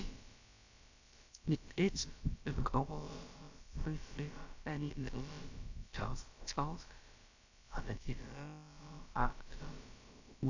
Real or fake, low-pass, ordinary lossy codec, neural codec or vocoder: fake; 7.2 kHz; AAC, 48 kbps; codec, 16 kHz, about 1 kbps, DyCAST, with the encoder's durations